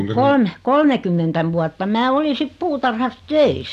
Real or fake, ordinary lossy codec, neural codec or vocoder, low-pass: real; none; none; 14.4 kHz